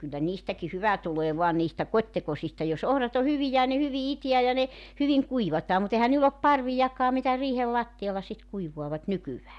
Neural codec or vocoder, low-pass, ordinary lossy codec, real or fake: none; none; none; real